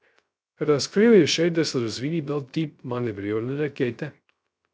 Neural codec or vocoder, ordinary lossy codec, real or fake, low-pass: codec, 16 kHz, 0.3 kbps, FocalCodec; none; fake; none